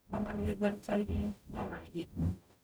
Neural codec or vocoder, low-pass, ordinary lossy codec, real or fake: codec, 44.1 kHz, 0.9 kbps, DAC; none; none; fake